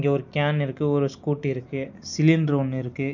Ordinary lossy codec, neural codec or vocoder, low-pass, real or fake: none; none; 7.2 kHz; real